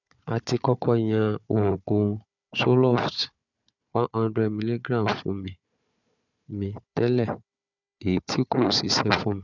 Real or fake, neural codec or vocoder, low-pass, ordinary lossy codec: fake; codec, 16 kHz, 4 kbps, FunCodec, trained on Chinese and English, 50 frames a second; 7.2 kHz; none